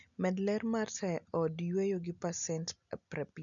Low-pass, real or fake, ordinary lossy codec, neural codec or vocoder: 7.2 kHz; real; none; none